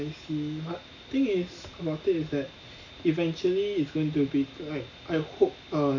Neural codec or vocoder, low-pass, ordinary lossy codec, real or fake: none; 7.2 kHz; none; real